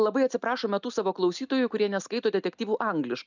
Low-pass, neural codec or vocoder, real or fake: 7.2 kHz; none; real